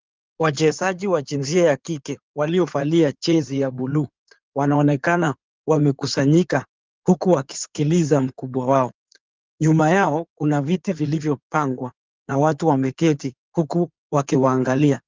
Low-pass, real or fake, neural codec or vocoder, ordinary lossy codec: 7.2 kHz; fake; codec, 16 kHz in and 24 kHz out, 2.2 kbps, FireRedTTS-2 codec; Opus, 24 kbps